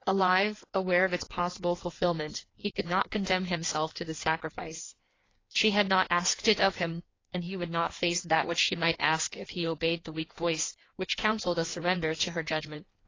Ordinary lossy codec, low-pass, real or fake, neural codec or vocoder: AAC, 32 kbps; 7.2 kHz; fake; codec, 16 kHz in and 24 kHz out, 1.1 kbps, FireRedTTS-2 codec